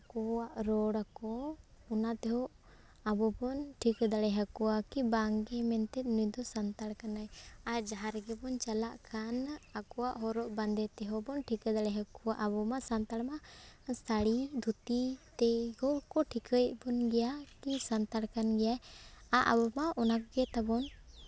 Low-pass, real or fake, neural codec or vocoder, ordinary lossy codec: none; real; none; none